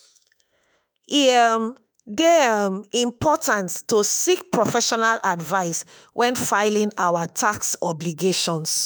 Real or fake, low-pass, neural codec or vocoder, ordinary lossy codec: fake; none; autoencoder, 48 kHz, 32 numbers a frame, DAC-VAE, trained on Japanese speech; none